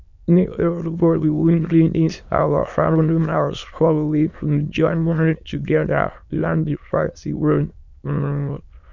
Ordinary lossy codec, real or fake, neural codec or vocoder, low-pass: none; fake; autoencoder, 22.05 kHz, a latent of 192 numbers a frame, VITS, trained on many speakers; 7.2 kHz